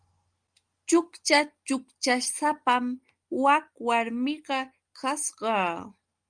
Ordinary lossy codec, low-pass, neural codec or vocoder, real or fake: Opus, 32 kbps; 9.9 kHz; none; real